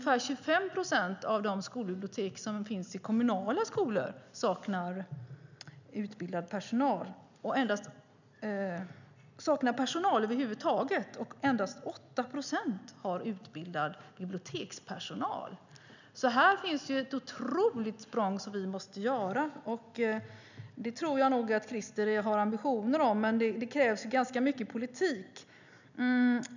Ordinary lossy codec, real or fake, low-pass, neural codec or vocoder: none; real; 7.2 kHz; none